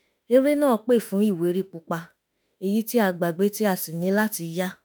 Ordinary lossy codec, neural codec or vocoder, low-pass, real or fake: none; autoencoder, 48 kHz, 32 numbers a frame, DAC-VAE, trained on Japanese speech; none; fake